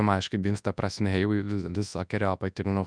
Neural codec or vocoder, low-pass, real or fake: codec, 24 kHz, 0.9 kbps, WavTokenizer, large speech release; 9.9 kHz; fake